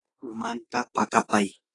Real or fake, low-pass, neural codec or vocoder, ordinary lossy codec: fake; 10.8 kHz; autoencoder, 48 kHz, 32 numbers a frame, DAC-VAE, trained on Japanese speech; AAC, 32 kbps